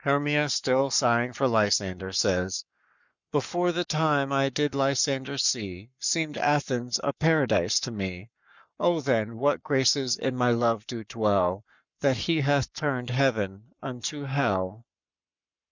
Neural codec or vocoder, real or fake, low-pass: codec, 44.1 kHz, 7.8 kbps, Pupu-Codec; fake; 7.2 kHz